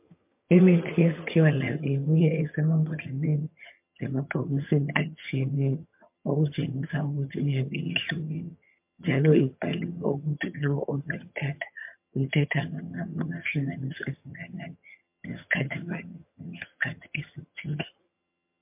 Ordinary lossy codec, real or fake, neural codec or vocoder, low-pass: MP3, 32 kbps; fake; vocoder, 22.05 kHz, 80 mel bands, HiFi-GAN; 3.6 kHz